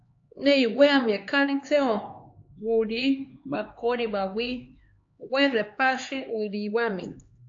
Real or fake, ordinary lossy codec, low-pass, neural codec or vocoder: fake; AAC, 48 kbps; 7.2 kHz; codec, 16 kHz, 4 kbps, X-Codec, HuBERT features, trained on LibriSpeech